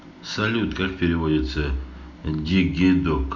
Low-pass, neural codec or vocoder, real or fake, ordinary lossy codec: 7.2 kHz; none; real; none